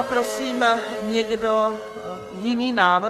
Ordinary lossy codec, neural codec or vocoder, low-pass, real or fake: MP3, 64 kbps; codec, 32 kHz, 1.9 kbps, SNAC; 14.4 kHz; fake